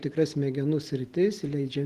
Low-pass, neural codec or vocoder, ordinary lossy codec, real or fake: 14.4 kHz; none; Opus, 16 kbps; real